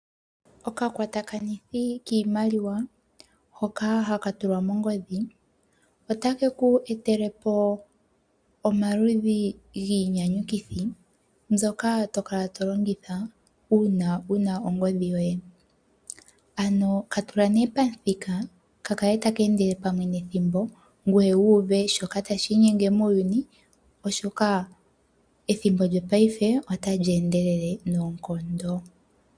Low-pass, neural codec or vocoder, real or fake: 9.9 kHz; none; real